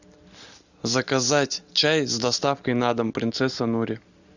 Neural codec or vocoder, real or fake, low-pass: none; real; 7.2 kHz